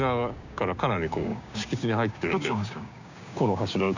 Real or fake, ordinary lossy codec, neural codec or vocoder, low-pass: fake; none; codec, 16 kHz, 6 kbps, DAC; 7.2 kHz